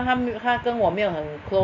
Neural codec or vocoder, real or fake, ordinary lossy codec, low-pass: none; real; none; 7.2 kHz